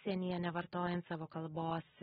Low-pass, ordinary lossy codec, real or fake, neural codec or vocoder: 7.2 kHz; AAC, 16 kbps; real; none